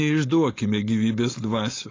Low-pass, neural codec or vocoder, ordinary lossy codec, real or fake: 7.2 kHz; codec, 16 kHz, 4.8 kbps, FACodec; AAC, 32 kbps; fake